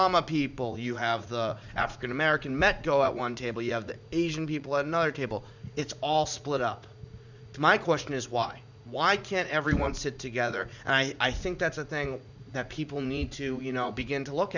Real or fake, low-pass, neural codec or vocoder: fake; 7.2 kHz; vocoder, 44.1 kHz, 80 mel bands, Vocos